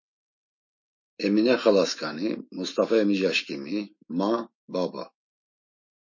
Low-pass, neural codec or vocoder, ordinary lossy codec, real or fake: 7.2 kHz; autoencoder, 48 kHz, 128 numbers a frame, DAC-VAE, trained on Japanese speech; MP3, 32 kbps; fake